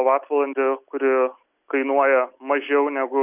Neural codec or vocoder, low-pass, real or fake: none; 3.6 kHz; real